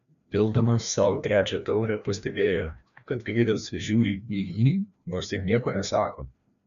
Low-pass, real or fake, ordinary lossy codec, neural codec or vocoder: 7.2 kHz; fake; MP3, 96 kbps; codec, 16 kHz, 1 kbps, FreqCodec, larger model